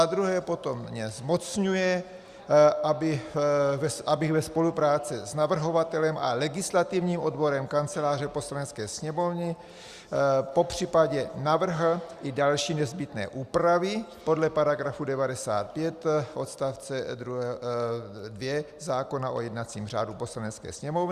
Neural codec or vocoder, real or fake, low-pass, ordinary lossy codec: none; real; 14.4 kHz; Opus, 64 kbps